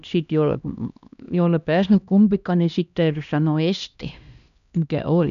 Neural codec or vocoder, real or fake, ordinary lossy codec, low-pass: codec, 16 kHz, 1 kbps, X-Codec, HuBERT features, trained on LibriSpeech; fake; none; 7.2 kHz